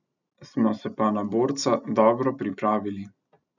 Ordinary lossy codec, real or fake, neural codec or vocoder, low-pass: none; real; none; 7.2 kHz